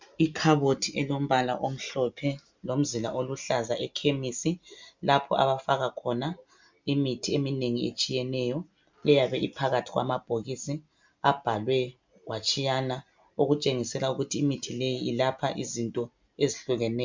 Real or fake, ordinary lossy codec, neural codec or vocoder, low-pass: real; MP3, 64 kbps; none; 7.2 kHz